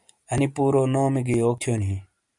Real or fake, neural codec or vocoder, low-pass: real; none; 10.8 kHz